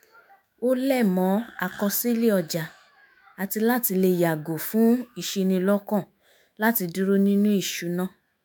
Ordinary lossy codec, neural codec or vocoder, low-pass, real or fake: none; autoencoder, 48 kHz, 128 numbers a frame, DAC-VAE, trained on Japanese speech; none; fake